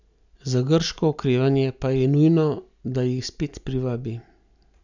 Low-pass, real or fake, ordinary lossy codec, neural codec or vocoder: 7.2 kHz; real; none; none